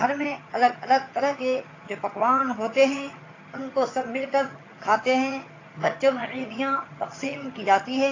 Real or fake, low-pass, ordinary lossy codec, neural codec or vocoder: fake; 7.2 kHz; AAC, 32 kbps; vocoder, 22.05 kHz, 80 mel bands, HiFi-GAN